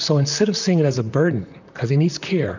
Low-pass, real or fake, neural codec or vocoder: 7.2 kHz; fake; vocoder, 44.1 kHz, 128 mel bands, Pupu-Vocoder